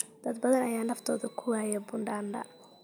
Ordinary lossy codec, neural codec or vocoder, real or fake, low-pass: none; none; real; none